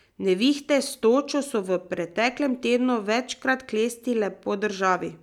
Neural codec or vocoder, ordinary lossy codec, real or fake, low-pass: none; none; real; 19.8 kHz